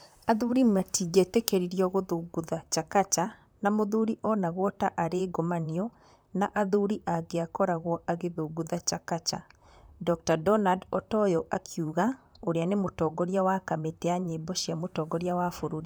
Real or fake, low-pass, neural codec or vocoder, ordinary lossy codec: fake; none; vocoder, 44.1 kHz, 128 mel bands, Pupu-Vocoder; none